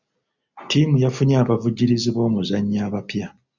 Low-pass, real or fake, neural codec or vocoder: 7.2 kHz; real; none